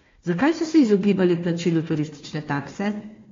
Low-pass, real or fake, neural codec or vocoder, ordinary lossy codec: 7.2 kHz; fake; codec, 16 kHz, 1 kbps, FunCodec, trained on Chinese and English, 50 frames a second; AAC, 32 kbps